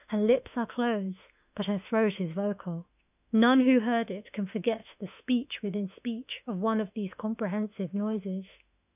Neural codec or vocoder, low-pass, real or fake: autoencoder, 48 kHz, 32 numbers a frame, DAC-VAE, trained on Japanese speech; 3.6 kHz; fake